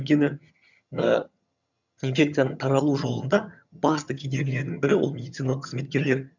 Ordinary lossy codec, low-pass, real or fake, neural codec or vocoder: none; 7.2 kHz; fake; vocoder, 22.05 kHz, 80 mel bands, HiFi-GAN